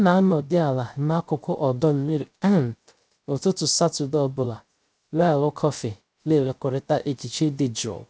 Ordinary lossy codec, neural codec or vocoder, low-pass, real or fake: none; codec, 16 kHz, 0.3 kbps, FocalCodec; none; fake